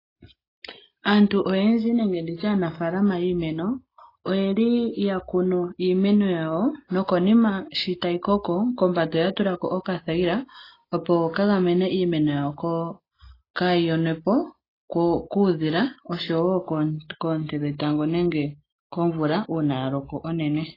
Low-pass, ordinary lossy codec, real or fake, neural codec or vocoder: 5.4 kHz; AAC, 24 kbps; real; none